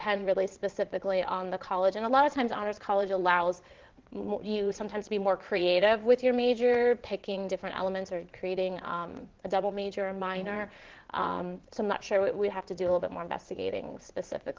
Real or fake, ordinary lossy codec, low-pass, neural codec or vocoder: fake; Opus, 16 kbps; 7.2 kHz; vocoder, 22.05 kHz, 80 mel bands, WaveNeXt